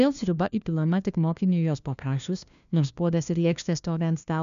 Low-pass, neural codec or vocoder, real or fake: 7.2 kHz; codec, 16 kHz, 1 kbps, FunCodec, trained on LibriTTS, 50 frames a second; fake